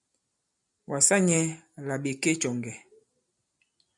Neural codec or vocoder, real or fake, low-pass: none; real; 10.8 kHz